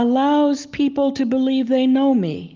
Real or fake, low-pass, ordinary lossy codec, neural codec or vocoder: real; 7.2 kHz; Opus, 32 kbps; none